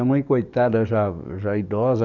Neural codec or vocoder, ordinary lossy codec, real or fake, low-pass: codec, 44.1 kHz, 7.8 kbps, DAC; none; fake; 7.2 kHz